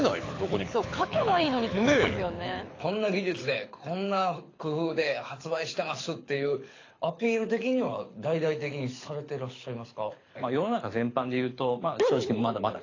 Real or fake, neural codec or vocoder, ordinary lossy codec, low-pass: fake; codec, 24 kHz, 6 kbps, HILCodec; AAC, 32 kbps; 7.2 kHz